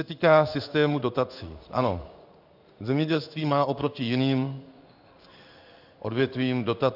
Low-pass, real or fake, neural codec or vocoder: 5.4 kHz; fake; codec, 16 kHz in and 24 kHz out, 1 kbps, XY-Tokenizer